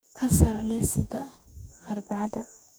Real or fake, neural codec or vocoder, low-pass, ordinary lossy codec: fake; codec, 44.1 kHz, 2.6 kbps, DAC; none; none